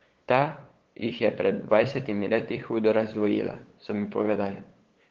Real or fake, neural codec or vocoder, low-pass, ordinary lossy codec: fake; codec, 16 kHz, 8 kbps, FunCodec, trained on LibriTTS, 25 frames a second; 7.2 kHz; Opus, 16 kbps